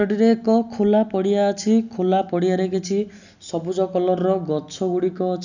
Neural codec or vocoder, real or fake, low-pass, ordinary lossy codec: none; real; 7.2 kHz; none